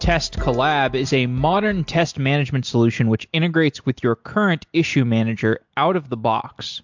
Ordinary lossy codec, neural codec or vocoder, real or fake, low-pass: MP3, 64 kbps; none; real; 7.2 kHz